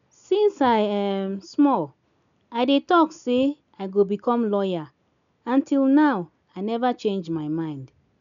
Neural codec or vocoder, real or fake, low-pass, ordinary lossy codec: none; real; 7.2 kHz; none